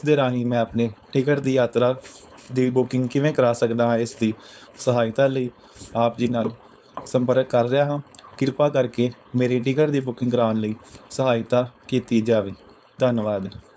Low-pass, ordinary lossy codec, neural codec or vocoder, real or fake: none; none; codec, 16 kHz, 4.8 kbps, FACodec; fake